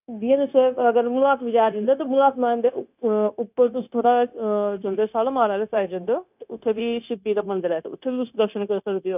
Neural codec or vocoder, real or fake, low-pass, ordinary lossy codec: codec, 16 kHz, 0.9 kbps, LongCat-Audio-Codec; fake; 3.6 kHz; none